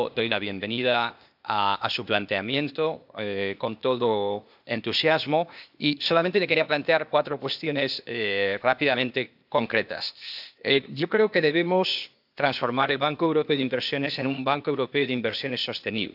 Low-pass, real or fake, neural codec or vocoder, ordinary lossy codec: 5.4 kHz; fake; codec, 16 kHz, 0.8 kbps, ZipCodec; AAC, 48 kbps